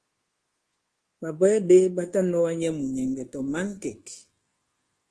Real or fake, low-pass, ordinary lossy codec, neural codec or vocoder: fake; 10.8 kHz; Opus, 16 kbps; codec, 24 kHz, 1.2 kbps, DualCodec